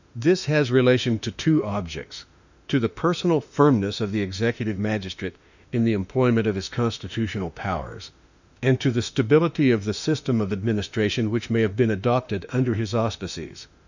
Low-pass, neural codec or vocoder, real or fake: 7.2 kHz; autoencoder, 48 kHz, 32 numbers a frame, DAC-VAE, trained on Japanese speech; fake